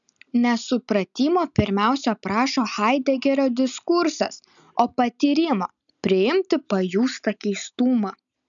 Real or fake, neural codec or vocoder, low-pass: real; none; 7.2 kHz